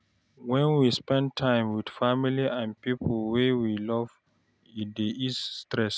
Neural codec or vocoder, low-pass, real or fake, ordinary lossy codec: none; none; real; none